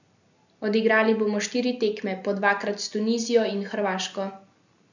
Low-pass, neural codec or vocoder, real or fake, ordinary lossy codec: 7.2 kHz; none; real; none